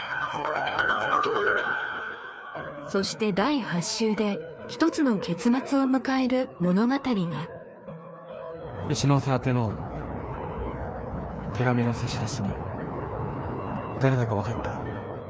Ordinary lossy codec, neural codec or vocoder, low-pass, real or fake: none; codec, 16 kHz, 2 kbps, FreqCodec, larger model; none; fake